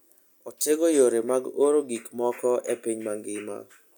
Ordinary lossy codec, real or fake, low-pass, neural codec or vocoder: none; real; none; none